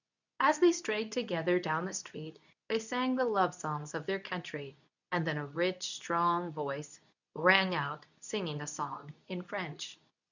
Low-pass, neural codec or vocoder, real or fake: 7.2 kHz; codec, 24 kHz, 0.9 kbps, WavTokenizer, medium speech release version 2; fake